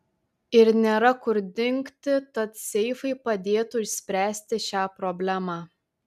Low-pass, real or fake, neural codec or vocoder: 14.4 kHz; real; none